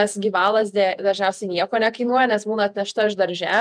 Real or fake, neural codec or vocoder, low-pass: fake; vocoder, 48 kHz, 128 mel bands, Vocos; 9.9 kHz